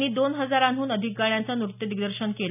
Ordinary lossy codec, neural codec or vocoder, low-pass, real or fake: none; none; 3.6 kHz; real